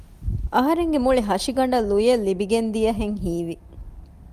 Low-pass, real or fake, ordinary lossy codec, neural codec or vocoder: 14.4 kHz; real; Opus, 32 kbps; none